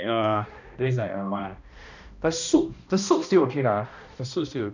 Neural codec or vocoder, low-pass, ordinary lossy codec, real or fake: codec, 16 kHz, 1 kbps, X-Codec, HuBERT features, trained on balanced general audio; 7.2 kHz; none; fake